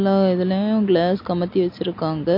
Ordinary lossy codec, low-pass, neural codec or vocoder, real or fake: MP3, 32 kbps; 5.4 kHz; none; real